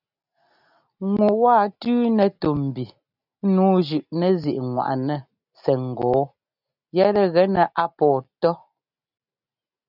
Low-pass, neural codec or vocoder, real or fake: 5.4 kHz; none; real